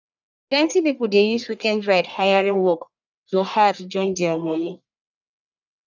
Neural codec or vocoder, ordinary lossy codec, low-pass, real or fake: codec, 44.1 kHz, 1.7 kbps, Pupu-Codec; none; 7.2 kHz; fake